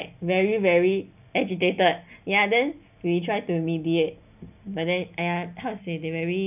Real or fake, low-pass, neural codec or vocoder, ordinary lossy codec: real; 3.6 kHz; none; none